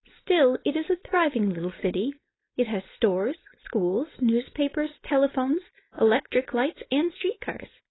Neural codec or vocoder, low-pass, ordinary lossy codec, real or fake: codec, 16 kHz, 4.8 kbps, FACodec; 7.2 kHz; AAC, 16 kbps; fake